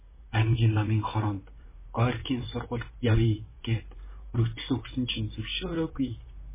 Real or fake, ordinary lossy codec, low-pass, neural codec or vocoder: fake; MP3, 16 kbps; 3.6 kHz; vocoder, 44.1 kHz, 128 mel bands, Pupu-Vocoder